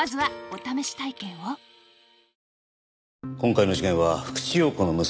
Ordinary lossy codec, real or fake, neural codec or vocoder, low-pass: none; real; none; none